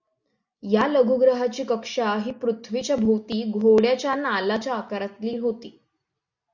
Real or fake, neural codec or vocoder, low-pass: real; none; 7.2 kHz